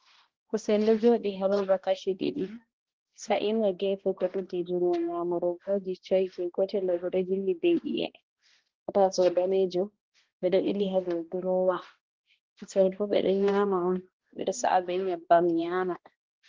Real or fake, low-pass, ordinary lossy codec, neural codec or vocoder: fake; 7.2 kHz; Opus, 16 kbps; codec, 16 kHz, 1 kbps, X-Codec, HuBERT features, trained on balanced general audio